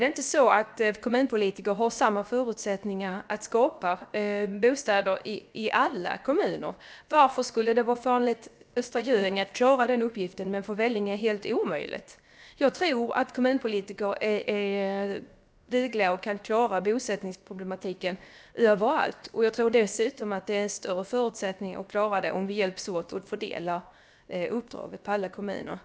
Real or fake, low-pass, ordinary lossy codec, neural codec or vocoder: fake; none; none; codec, 16 kHz, 0.7 kbps, FocalCodec